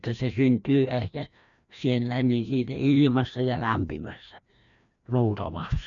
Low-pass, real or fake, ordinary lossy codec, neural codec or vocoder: 7.2 kHz; fake; none; codec, 16 kHz, 1 kbps, FreqCodec, larger model